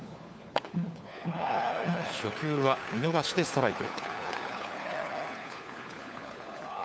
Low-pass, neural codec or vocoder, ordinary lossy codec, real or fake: none; codec, 16 kHz, 4 kbps, FunCodec, trained on LibriTTS, 50 frames a second; none; fake